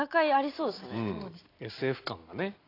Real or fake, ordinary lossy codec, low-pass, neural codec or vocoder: real; AAC, 24 kbps; 5.4 kHz; none